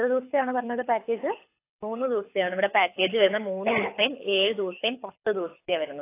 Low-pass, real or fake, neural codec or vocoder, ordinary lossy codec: 3.6 kHz; fake; codec, 24 kHz, 6 kbps, HILCodec; AAC, 24 kbps